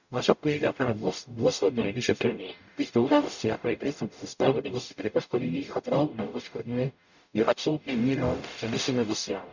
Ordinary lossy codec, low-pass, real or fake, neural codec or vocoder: none; 7.2 kHz; fake; codec, 44.1 kHz, 0.9 kbps, DAC